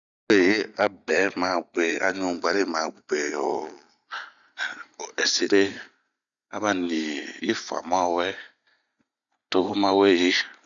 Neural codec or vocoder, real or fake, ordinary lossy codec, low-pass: none; real; none; 7.2 kHz